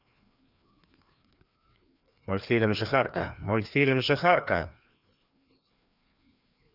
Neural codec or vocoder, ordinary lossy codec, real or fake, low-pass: codec, 16 kHz, 2 kbps, FreqCodec, larger model; none; fake; 5.4 kHz